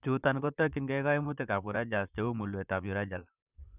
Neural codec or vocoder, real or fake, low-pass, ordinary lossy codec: vocoder, 44.1 kHz, 128 mel bands, Pupu-Vocoder; fake; 3.6 kHz; none